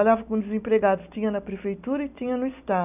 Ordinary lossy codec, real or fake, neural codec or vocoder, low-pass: none; real; none; 3.6 kHz